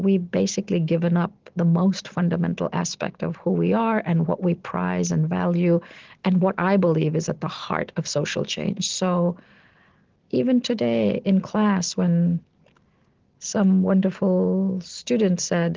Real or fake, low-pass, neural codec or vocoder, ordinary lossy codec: real; 7.2 kHz; none; Opus, 16 kbps